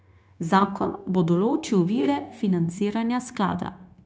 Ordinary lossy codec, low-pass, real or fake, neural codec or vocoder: none; none; fake; codec, 16 kHz, 0.9 kbps, LongCat-Audio-Codec